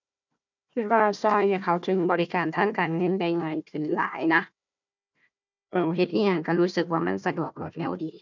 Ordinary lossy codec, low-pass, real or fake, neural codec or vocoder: none; 7.2 kHz; fake; codec, 16 kHz, 1 kbps, FunCodec, trained on Chinese and English, 50 frames a second